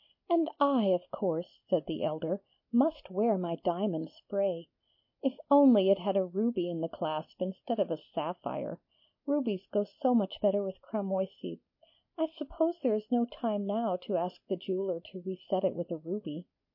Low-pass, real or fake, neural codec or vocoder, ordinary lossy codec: 5.4 kHz; real; none; MP3, 32 kbps